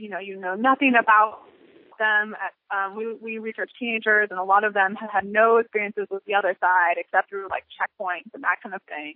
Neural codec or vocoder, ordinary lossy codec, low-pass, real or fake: none; MP3, 32 kbps; 5.4 kHz; real